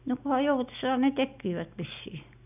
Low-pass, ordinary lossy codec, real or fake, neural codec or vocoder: 3.6 kHz; none; real; none